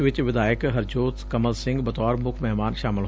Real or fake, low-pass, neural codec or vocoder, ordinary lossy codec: real; none; none; none